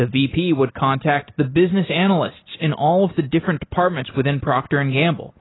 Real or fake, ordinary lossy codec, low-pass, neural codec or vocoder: real; AAC, 16 kbps; 7.2 kHz; none